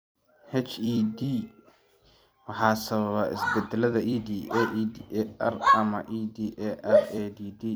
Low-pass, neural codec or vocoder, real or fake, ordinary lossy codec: none; vocoder, 44.1 kHz, 128 mel bands every 256 samples, BigVGAN v2; fake; none